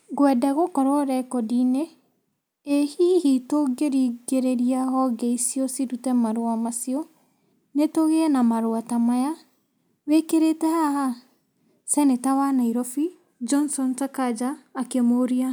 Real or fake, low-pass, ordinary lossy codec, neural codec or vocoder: real; none; none; none